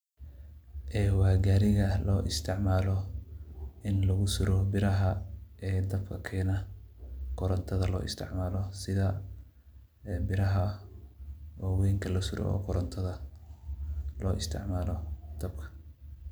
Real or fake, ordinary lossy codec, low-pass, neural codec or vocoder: real; none; none; none